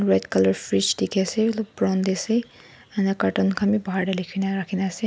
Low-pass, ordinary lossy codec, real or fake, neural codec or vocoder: none; none; real; none